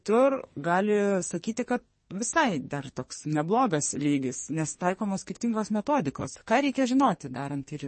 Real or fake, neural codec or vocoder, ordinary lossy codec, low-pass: fake; codec, 44.1 kHz, 2.6 kbps, SNAC; MP3, 32 kbps; 10.8 kHz